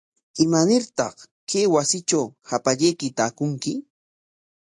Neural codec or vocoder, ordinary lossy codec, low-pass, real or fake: none; AAC, 64 kbps; 10.8 kHz; real